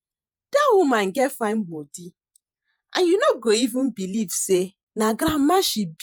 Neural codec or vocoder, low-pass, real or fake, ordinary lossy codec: vocoder, 48 kHz, 128 mel bands, Vocos; none; fake; none